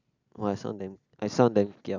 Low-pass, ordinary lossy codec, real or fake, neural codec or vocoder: 7.2 kHz; none; real; none